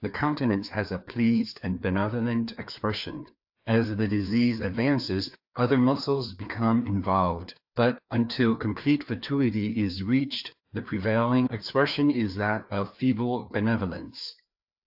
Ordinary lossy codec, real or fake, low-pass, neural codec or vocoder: AAC, 48 kbps; fake; 5.4 kHz; codec, 16 kHz, 2 kbps, FreqCodec, larger model